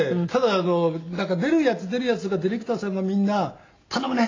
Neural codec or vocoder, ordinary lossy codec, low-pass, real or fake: none; AAC, 32 kbps; 7.2 kHz; real